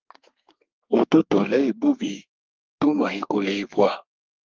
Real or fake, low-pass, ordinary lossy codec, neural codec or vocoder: fake; 7.2 kHz; Opus, 32 kbps; codec, 32 kHz, 1.9 kbps, SNAC